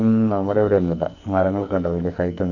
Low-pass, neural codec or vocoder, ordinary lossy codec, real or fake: 7.2 kHz; codec, 44.1 kHz, 2.6 kbps, SNAC; none; fake